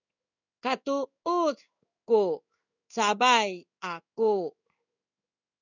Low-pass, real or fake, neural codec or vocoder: 7.2 kHz; fake; codec, 16 kHz in and 24 kHz out, 1 kbps, XY-Tokenizer